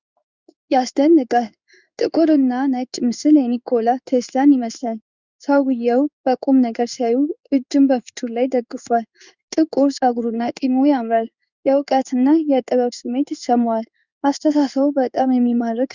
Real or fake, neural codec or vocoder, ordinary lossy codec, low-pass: fake; codec, 16 kHz in and 24 kHz out, 1 kbps, XY-Tokenizer; Opus, 64 kbps; 7.2 kHz